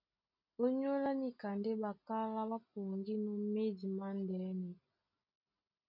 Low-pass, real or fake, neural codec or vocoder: 5.4 kHz; real; none